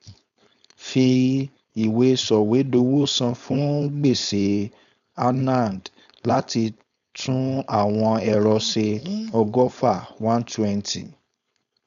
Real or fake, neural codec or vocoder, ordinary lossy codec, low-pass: fake; codec, 16 kHz, 4.8 kbps, FACodec; none; 7.2 kHz